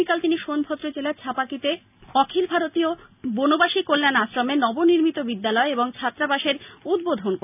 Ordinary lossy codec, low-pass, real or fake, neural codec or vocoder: none; 3.6 kHz; real; none